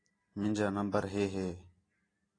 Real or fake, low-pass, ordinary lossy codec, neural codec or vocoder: real; 9.9 kHz; AAC, 32 kbps; none